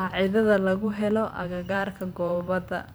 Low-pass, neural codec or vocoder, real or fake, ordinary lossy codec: none; vocoder, 44.1 kHz, 128 mel bands every 512 samples, BigVGAN v2; fake; none